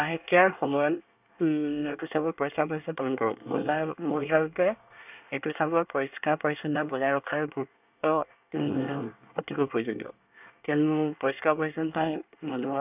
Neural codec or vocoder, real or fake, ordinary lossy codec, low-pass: codec, 24 kHz, 1 kbps, SNAC; fake; none; 3.6 kHz